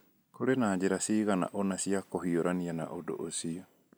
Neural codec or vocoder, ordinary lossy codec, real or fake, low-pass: none; none; real; none